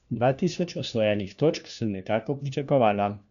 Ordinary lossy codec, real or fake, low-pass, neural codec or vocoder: none; fake; 7.2 kHz; codec, 16 kHz, 1 kbps, FunCodec, trained on LibriTTS, 50 frames a second